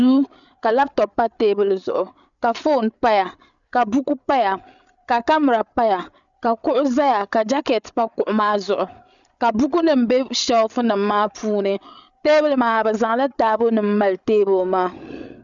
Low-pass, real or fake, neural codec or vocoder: 7.2 kHz; fake; codec, 16 kHz, 8 kbps, FreqCodec, larger model